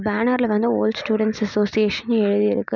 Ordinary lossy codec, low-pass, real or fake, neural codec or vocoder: none; 7.2 kHz; real; none